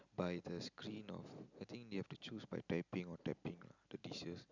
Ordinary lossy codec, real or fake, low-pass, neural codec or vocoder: none; real; 7.2 kHz; none